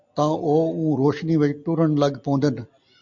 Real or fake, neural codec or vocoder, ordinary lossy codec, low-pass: real; none; MP3, 64 kbps; 7.2 kHz